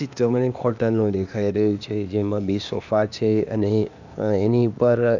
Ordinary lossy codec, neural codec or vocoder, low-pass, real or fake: none; codec, 16 kHz, 0.8 kbps, ZipCodec; 7.2 kHz; fake